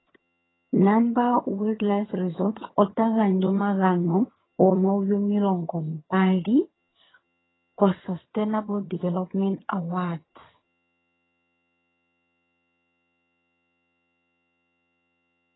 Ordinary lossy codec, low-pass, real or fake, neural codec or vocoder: AAC, 16 kbps; 7.2 kHz; fake; vocoder, 22.05 kHz, 80 mel bands, HiFi-GAN